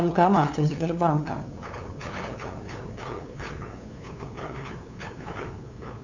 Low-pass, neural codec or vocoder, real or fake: 7.2 kHz; codec, 16 kHz, 2 kbps, FunCodec, trained on LibriTTS, 25 frames a second; fake